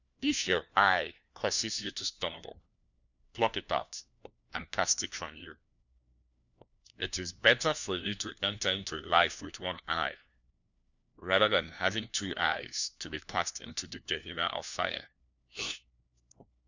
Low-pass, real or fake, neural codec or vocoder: 7.2 kHz; fake; codec, 16 kHz, 1 kbps, FunCodec, trained on LibriTTS, 50 frames a second